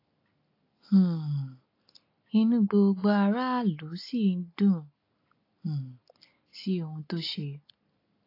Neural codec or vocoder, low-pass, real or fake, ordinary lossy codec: none; 5.4 kHz; real; AAC, 32 kbps